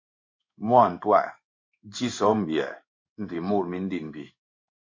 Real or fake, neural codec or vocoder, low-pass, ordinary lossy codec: fake; codec, 16 kHz in and 24 kHz out, 1 kbps, XY-Tokenizer; 7.2 kHz; MP3, 48 kbps